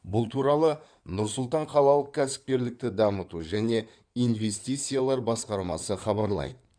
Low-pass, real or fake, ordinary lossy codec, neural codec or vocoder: 9.9 kHz; fake; none; codec, 16 kHz in and 24 kHz out, 2.2 kbps, FireRedTTS-2 codec